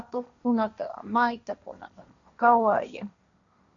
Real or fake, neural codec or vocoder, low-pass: fake; codec, 16 kHz, 1.1 kbps, Voila-Tokenizer; 7.2 kHz